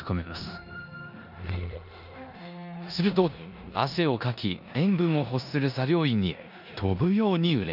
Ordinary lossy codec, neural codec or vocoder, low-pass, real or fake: none; codec, 16 kHz in and 24 kHz out, 0.9 kbps, LongCat-Audio-Codec, four codebook decoder; 5.4 kHz; fake